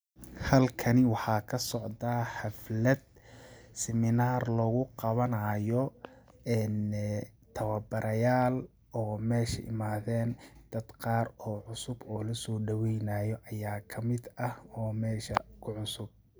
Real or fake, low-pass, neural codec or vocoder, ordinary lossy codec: real; none; none; none